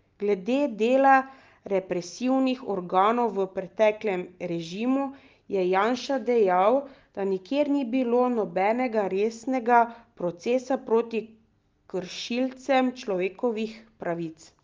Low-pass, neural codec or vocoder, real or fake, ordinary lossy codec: 7.2 kHz; none; real; Opus, 24 kbps